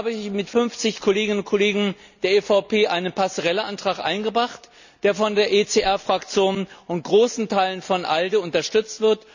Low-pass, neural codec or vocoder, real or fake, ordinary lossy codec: 7.2 kHz; none; real; none